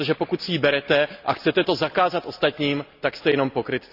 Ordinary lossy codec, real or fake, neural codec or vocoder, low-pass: none; real; none; 5.4 kHz